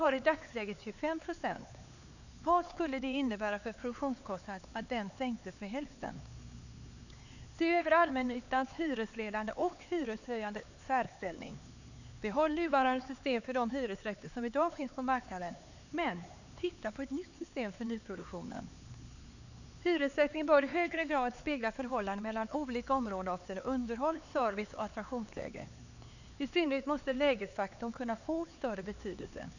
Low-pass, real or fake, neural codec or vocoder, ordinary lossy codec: 7.2 kHz; fake; codec, 16 kHz, 4 kbps, X-Codec, HuBERT features, trained on LibriSpeech; none